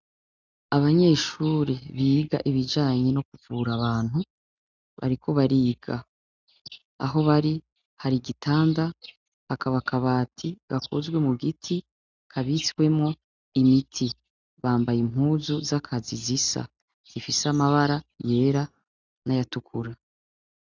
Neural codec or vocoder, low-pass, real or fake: none; 7.2 kHz; real